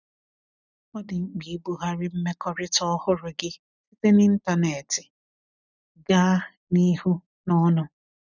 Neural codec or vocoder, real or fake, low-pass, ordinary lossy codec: none; real; 7.2 kHz; none